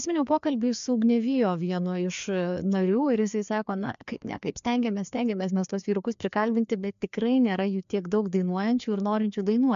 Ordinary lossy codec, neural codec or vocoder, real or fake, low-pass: MP3, 64 kbps; codec, 16 kHz, 2 kbps, FreqCodec, larger model; fake; 7.2 kHz